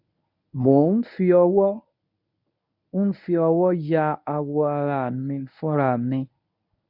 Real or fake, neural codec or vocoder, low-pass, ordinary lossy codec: fake; codec, 24 kHz, 0.9 kbps, WavTokenizer, medium speech release version 1; 5.4 kHz; none